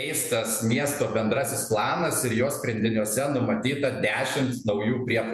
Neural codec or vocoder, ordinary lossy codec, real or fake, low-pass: vocoder, 48 kHz, 128 mel bands, Vocos; MP3, 96 kbps; fake; 14.4 kHz